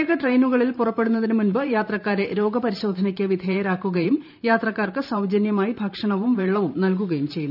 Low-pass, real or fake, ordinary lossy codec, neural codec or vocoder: 5.4 kHz; fake; none; vocoder, 44.1 kHz, 128 mel bands every 512 samples, BigVGAN v2